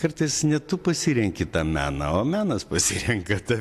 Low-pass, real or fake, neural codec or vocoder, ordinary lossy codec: 14.4 kHz; real; none; AAC, 64 kbps